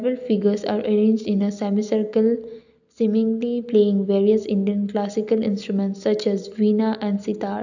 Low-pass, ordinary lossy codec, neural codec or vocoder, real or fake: 7.2 kHz; none; none; real